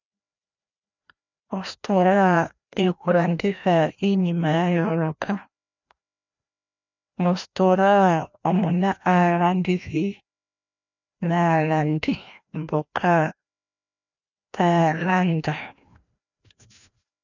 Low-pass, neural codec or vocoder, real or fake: 7.2 kHz; codec, 16 kHz, 1 kbps, FreqCodec, larger model; fake